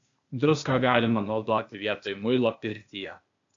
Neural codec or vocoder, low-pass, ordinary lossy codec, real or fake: codec, 16 kHz, 0.8 kbps, ZipCodec; 7.2 kHz; AAC, 64 kbps; fake